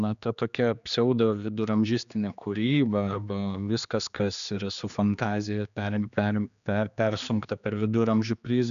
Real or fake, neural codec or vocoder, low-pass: fake; codec, 16 kHz, 2 kbps, X-Codec, HuBERT features, trained on general audio; 7.2 kHz